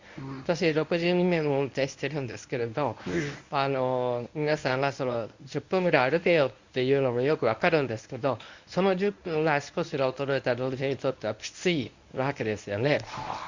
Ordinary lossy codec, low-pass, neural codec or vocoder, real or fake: Opus, 64 kbps; 7.2 kHz; codec, 24 kHz, 0.9 kbps, WavTokenizer, medium speech release version 1; fake